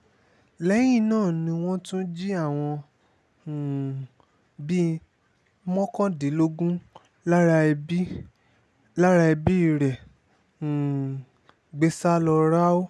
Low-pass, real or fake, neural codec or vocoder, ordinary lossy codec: none; real; none; none